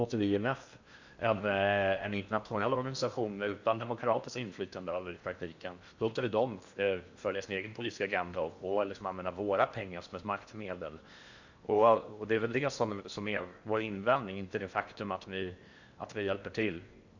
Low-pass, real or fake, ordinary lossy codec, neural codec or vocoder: 7.2 kHz; fake; Opus, 64 kbps; codec, 16 kHz in and 24 kHz out, 0.8 kbps, FocalCodec, streaming, 65536 codes